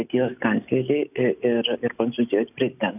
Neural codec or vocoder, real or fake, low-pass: none; real; 3.6 kHz